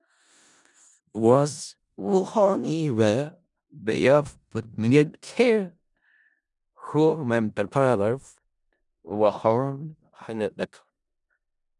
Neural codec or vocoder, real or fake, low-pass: codec, 16 kHz in and 24 kHz out, 0.4 kbps, LongCat-Audio-Codec, four codebook decoder; fake; 10.8 kHz